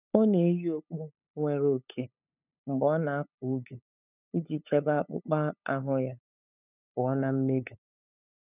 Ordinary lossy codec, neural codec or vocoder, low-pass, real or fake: none; codec, 16 kHz, 8 kbps, FunCodec, trained on LibriTTS, 25 frames a second; 3.6 kHz; fake